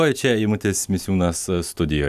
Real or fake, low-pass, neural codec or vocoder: real; 14.4 kHz; none